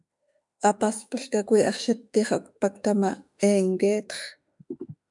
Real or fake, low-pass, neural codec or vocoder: fake; 10.8 kHz; autoencoder, 48 kHz, 32 numbers a frame, DAC-VAE, trained on Japanese speech